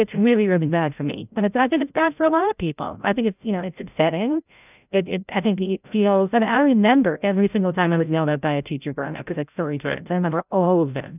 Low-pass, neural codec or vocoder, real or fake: 3.6 kHz; codec, 16 kHz, 0.5 kbps, FreqCodec, larger model; fake